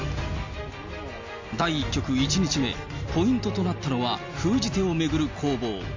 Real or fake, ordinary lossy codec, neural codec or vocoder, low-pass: real; MP3, 48 kbps; none; 7.2 kHz